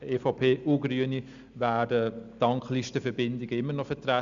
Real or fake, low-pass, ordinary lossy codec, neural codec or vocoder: real; 7.2 kHz; Opus, 64 kbps; none